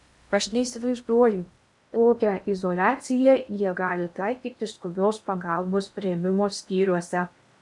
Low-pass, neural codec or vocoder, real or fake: 10.8 kHz; codec, 16 kHz in and 24 kHz out, 0.6 kbps, FocalCodec, streaming, 2048 codes; fake